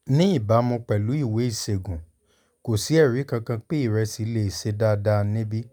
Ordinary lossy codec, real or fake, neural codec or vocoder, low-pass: none; real; none; 19.8 kHz